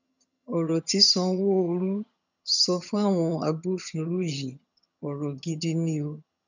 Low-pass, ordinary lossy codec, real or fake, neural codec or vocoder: 7.2 kHz; none; fake; vocoder, 22.05 kHz, 80 mel bands, HiFi-GAN